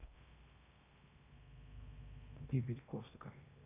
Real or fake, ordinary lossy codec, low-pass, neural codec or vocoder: fake; none; 3.6 kHz; codec, 16 kHz in and 24 kHz out, 0.8 kbps, FocalCodec, streaming, 65536 codes